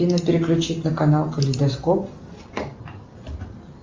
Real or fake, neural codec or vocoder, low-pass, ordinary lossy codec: real; none; 7.2 kHz; Opus, 32 kbps